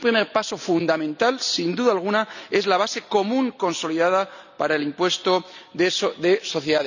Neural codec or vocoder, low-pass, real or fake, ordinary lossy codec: none; 7.2 kHz; real; none